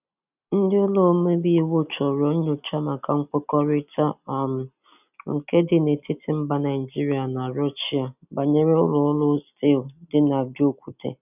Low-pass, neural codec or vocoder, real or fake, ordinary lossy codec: 3.6 kHz; none; real; none